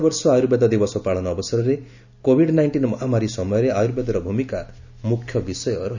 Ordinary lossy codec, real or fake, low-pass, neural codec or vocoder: none; real; 7.2 kHz; none